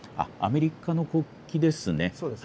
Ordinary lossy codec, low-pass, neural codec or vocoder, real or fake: none; none; none; real